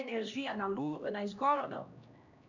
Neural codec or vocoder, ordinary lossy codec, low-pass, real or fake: codec, 16 kHz, 1 kbps, X-Codec, HuBERT features, trained on LibriSpeech; none; 7.2 kHz; fake